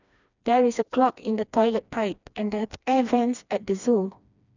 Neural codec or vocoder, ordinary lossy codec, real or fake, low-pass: codec, 16 kHz, 2 kbps, FreqCodec, smaller model; none; fake; 7.2 kHz